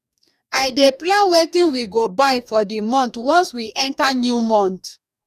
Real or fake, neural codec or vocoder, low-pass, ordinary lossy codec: fake; codec, 44.1 kHz, 2.6 kbps, DAC; 14.4 kHz; none